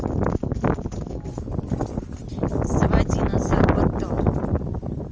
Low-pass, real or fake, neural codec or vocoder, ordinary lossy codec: 7.2 kHz; real; none; Opus, 24 kbps